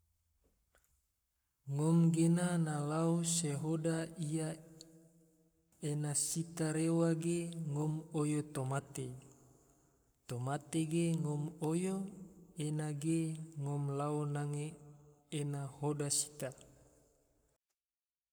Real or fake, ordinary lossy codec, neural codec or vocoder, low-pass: fake; none; codec, 44.1 kHz, 7.8 kbps, Pupu-Codec; none